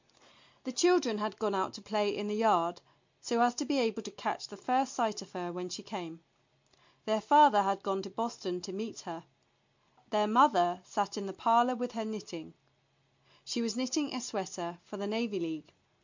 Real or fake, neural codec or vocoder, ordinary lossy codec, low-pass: real; none; MP3, 64 kbps; 7.2 kHz